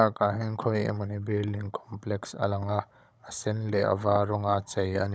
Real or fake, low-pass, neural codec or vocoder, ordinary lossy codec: fake; none; codec, 16 kHz, 16 kbps, FunCodec, trained on Chinese and English, 50 frames a second; none